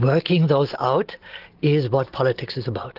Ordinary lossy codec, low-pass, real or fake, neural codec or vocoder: Opus, 16 kbps; 5.4 kHz; real; none